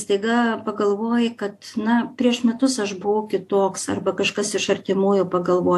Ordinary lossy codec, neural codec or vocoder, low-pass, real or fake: AAC, 64 kbps; none; 14.4 kHz; real